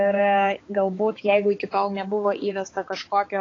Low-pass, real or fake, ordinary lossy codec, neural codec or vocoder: 7.2 kHz; fake; AAC, 32 kbps; codec, 16 kHz, 4 kbps, X-Codec, HuBERT features, trained on balanced general audio